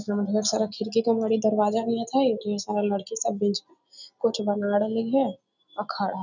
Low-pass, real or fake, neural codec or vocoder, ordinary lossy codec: 7.2 kHz; real; none; none